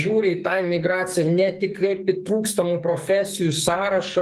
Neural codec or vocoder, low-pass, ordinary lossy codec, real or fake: codec, 44.1 kHz, 2.6 kbps, SNAC; 14.4 kHz; Opus, 32 kbps; fake